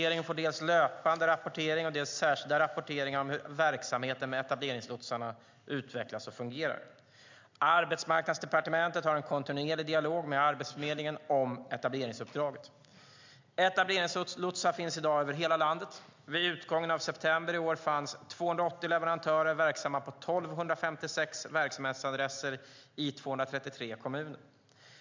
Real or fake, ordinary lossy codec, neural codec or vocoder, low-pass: real; MP3, 64 kbps; none; 7.2 kHz